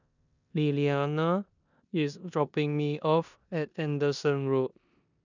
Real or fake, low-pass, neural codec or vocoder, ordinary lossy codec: fake; 7.2 kHz; codec, 16 kHz in and 24 kHz out, 0.9 kbps, LongCat-Audio-Codec, four codebook decoder; none